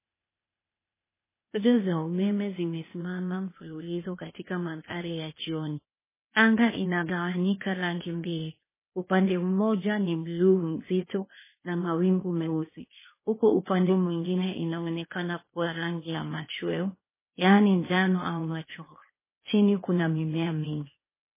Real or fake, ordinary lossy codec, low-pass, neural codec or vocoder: fake; MP3, 16 kbps; 3.6 kHz; codec, 16 kHz, 0.8 kbps, ZipCodec